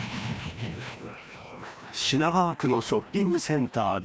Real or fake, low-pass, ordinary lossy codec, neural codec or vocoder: fake; none; none; codec, 16 kHz, 1 kbps, FreqCodec, larger model